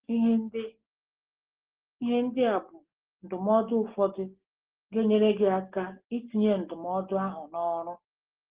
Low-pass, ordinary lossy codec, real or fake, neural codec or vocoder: 3.6 kHz; Opus, 16 kbps; real; none